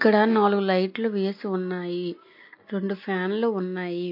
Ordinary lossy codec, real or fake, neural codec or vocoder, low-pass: MP3, 32 kbps; real; none; 5.4 kHz